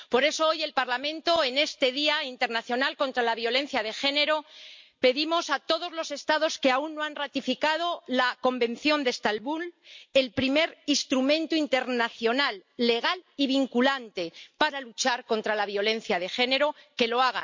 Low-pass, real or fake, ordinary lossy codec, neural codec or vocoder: 7.2 kHz; real; MP3, 48 kbps; none